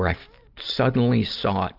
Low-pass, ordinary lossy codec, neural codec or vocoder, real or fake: 5.4 kHz; Opus, 32 kbps; none; real